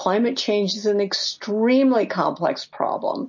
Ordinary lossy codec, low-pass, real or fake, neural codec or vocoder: MP3, 32 kbps; 7.2 kHz; real; none